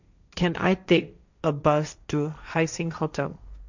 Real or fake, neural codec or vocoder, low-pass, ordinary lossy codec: fake; codec, 16 kHz, 1.1 kbps, Voila-Tokenizer; 7.2 kHz; none